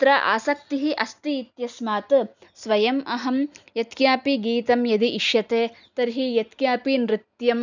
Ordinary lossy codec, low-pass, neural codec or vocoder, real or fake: none; 7.2 kHz; none; real